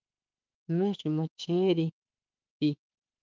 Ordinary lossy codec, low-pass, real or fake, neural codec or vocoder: Opus, 24 kbps; 7.2 kHz; fake; autoencoder, 48 kHz, 32 numbers a frame, DAC-VAE, trained on Japanese speech